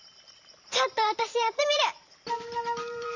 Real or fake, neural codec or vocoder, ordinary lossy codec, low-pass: fake; vocoder, 44.1 kHz, 128 mel bands every 512 samples, BigVGAN v2; none; 7.2 kHz